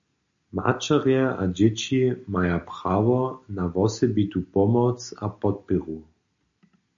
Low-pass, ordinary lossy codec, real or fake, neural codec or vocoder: 7.2 kHz; MP3, 64 kbps; real; none